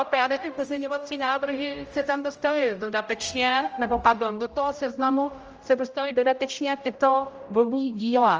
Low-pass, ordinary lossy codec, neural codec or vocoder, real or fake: 7.2 kHz; Opus, 24 kbps; codec, 16 kHz, 0.5 kbps, X-Codec, HuBERT features, trained on general audio; fake